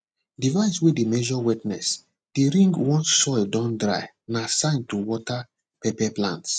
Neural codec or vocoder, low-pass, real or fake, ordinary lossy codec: none; none; real; none